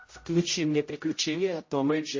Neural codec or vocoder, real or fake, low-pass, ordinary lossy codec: codec, 16 kHz, 0.5 kbps, X-Codec, HuBERT features, trained on general audio; fake; 7.2 kHz; MP3, 32 kbps